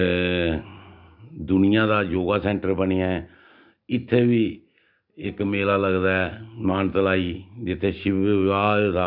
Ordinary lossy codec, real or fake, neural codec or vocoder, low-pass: none; real; none; 5.4 kHz